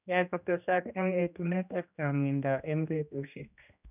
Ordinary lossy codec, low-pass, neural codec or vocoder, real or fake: none; 3.6 kHz; codec, 16 kHz, 1 kbps, X-Codec, HuBERT features, trained on general audio; fake